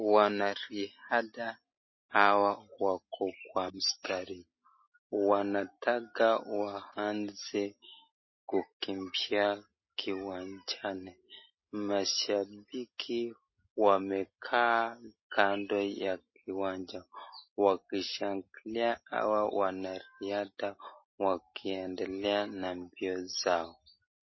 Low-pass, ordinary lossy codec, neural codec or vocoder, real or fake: 7.2 kHz; MP3, 24 kbps; none; real